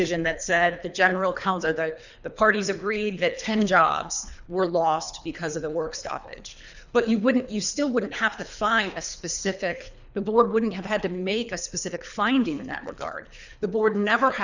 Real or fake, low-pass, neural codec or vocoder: fake; 7.2 kHz; codec, 24 kHz, 3 kbps, HILCodec